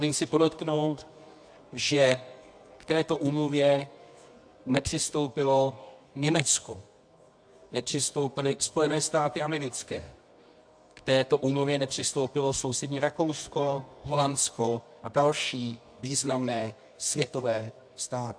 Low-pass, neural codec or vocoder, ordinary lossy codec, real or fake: 9.9 kHz; codec, 24 kHz, 0.9 kbps, WavTokenizer, medium music audio release; AAC, 64 kbps; fake